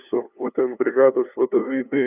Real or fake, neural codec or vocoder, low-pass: fake; codec, 16 kHz, 2 kbps, FunCodec, trained on LibriTTS, 25 frames a second; 3.6 kHz